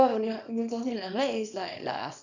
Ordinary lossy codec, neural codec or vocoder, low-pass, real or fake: none; codec, 24 kHz, 0.9 kbps, WavTokenizer, small release; 7.2 kHz; fake